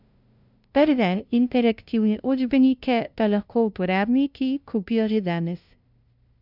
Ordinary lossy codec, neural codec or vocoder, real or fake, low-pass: none; codec, 16 kHz, 0.5 kbps, FunCodec, trained on LibriTTS, 25 frames a second; fake; 5.4 kHz